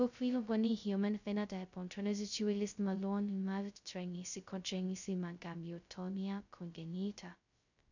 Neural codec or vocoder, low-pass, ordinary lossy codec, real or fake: codec, 16 kHz, 0.2 kbps, FocalCodec; 7.2 kHz; none; fake